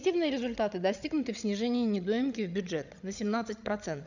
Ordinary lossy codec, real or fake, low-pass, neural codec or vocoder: none; fake; 7.2 kHz; codec, 16 kHz, 16 kbps, FreqCodec, larger model